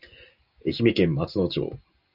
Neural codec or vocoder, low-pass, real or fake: none; 5.4 kHz; real